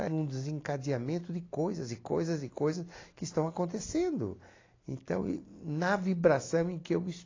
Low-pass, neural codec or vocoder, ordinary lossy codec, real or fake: 7.2 kHz; none; AAC, 32 kbps; real